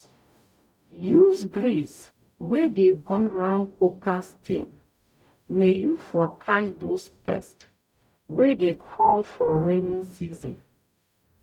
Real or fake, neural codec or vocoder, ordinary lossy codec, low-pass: fake; codec, 44.1 kHz, 0.9 kbps, DAC; none; 19.8 kHz